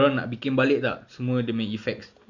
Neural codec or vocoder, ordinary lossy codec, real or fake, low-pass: none; Opus, 64 kbps; real; 7.2 kHz